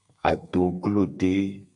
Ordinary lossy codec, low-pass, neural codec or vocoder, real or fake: MP3, 64 kbps; 10.8 kHz; codec, 32 kHz, 1.9 kbps, SNAC; fake